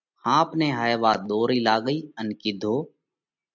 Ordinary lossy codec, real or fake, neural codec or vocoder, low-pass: MP3, 64 kbps; real; none; 7.2 kHz